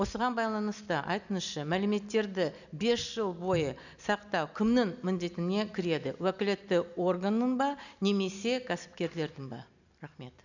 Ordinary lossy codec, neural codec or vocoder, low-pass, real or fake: none; none; 7.2 kHz; real